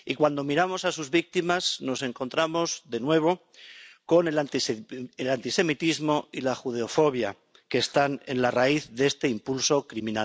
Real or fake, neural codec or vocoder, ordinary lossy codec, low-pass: real; none; none; none